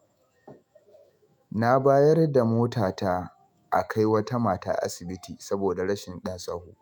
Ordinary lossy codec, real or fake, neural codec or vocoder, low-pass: none; fake; autoencoder, 48 kHz, 128 numbers a frame, DAC-VAE, trained on Japanese speech; none